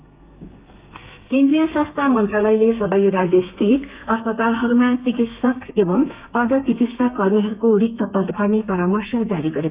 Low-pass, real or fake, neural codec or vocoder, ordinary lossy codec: 3.6 kHz; fake; codec, 32 kHz, 1.9 kbps, SNAC; Opus, 64 kbps